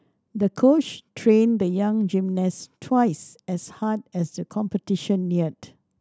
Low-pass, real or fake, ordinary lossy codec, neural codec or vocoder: none; real; none; none